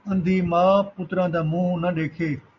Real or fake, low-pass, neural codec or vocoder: real; 7.2 kHz; none